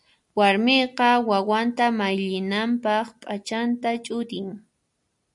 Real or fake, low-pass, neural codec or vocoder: real; 10.8 kHz; none